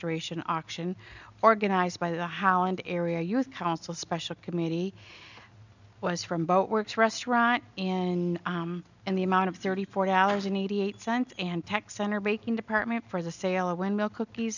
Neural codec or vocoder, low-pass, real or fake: none; 7.2 kHz; real